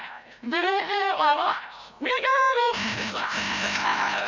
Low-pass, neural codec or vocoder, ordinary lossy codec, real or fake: 7.2 kHz; codec, 16 kHz, 0.5 kbps, FreqCodec, larger model; none; fake